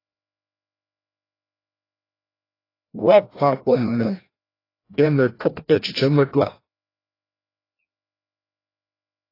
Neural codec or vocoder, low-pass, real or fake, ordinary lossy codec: codec, 16 kHz, 1 kbps, FreqCodec, larger model; 5.4 kHz; fake; AAC, 24 kbps